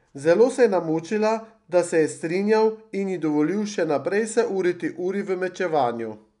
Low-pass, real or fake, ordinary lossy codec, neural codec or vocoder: 10.8 kHz; real; none; none